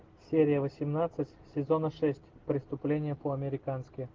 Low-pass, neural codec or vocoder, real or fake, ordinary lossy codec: 7.2 kHz; none; real; Opus, 16 kbps